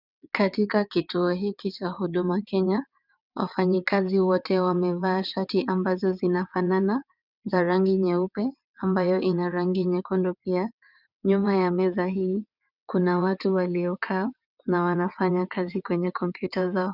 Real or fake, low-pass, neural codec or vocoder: fake; 5.4 kHz; vocoder, 22.05 kHz, 80 mel bands, WaveNeXt